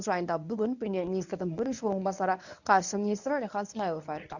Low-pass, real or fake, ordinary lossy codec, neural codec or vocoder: 7.2 kHz; fake; none; codec, 24 kHz, 0.9 kbps, WavTokenizer, medium speech release version 1